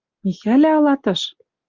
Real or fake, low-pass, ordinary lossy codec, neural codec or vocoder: real; 7.2 kHz; Opus, 24 kbps; none